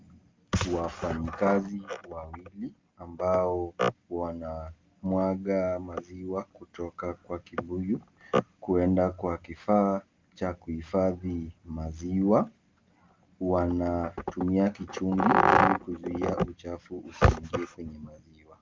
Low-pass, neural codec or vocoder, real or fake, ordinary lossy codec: 7.2 kHz; none; real; Opus, 32 kbps